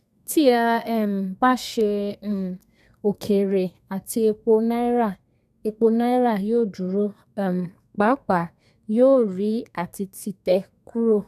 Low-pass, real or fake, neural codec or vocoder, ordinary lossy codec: 14.4 kHz; fake; codec, 32 kHz, 1.9 kbps, SNAC; none